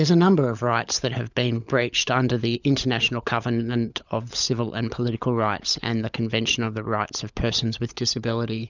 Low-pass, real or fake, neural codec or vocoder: 7.2 kHz; fake; codec, 16 kHz, 16 kbps, FunCodec, trained on LibriTTS, 50 frames a second